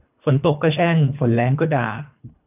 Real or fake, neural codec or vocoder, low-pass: fake; codec, 24 kHz, 3 kbps, HILCodec; 3.6 kHz